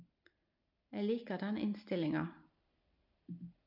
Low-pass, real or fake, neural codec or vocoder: 5.4 kHz; real; none